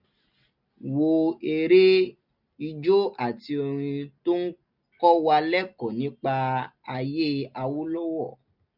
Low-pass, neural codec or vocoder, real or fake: 5.4 kHz; none; real